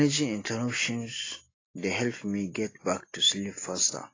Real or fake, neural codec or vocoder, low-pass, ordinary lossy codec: real; none; 7.2 kHz; AAC, 32 kbps